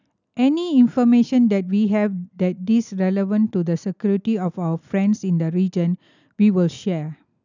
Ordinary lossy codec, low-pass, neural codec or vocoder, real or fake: none; 7.2 kHz; none; real